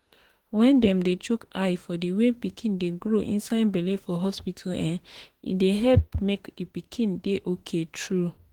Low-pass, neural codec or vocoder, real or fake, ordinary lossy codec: 19.8 kHz; autoencoder, 48 kHz, 32 numbers a frame, DAC-VAE, trained on Japanese speech; fake; Opus, 16 kbps